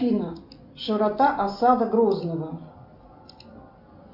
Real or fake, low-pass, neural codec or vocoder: real; 5.4 kHz; none